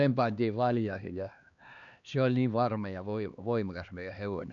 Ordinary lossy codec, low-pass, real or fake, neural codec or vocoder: none; 7.2 kHz; fake; codec, 16 kHz, 2 kbps, X-Codec, HuBERT features, trained on LibriSpeech